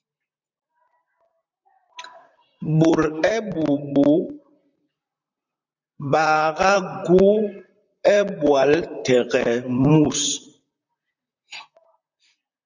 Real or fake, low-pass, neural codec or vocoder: fake; 7.2 kHz; vocoder, 44.1 kHz, 128 mel bands, Pupu-Vocoder